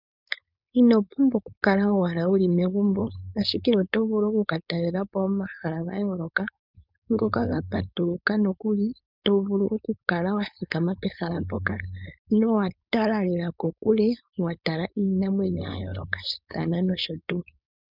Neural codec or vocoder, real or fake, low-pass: codec, 16 kHz, 4.8 kbps, FACodec; fake; 5.4 kHz